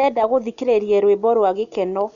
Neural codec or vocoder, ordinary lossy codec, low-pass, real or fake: none; none; 7.2 kHz; real